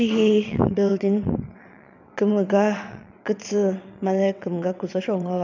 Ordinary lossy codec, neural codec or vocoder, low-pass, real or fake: none; vocoder, 22.05 kHz, 80 mel bands, WaveNeXt; 7.2 kHz; fake